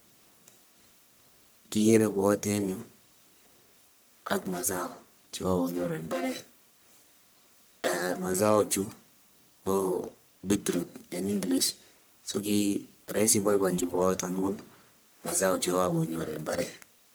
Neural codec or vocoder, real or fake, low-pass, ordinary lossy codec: codec, 44.1 kHz, 1.7 kbps, Pupu-Codec; fake; none; none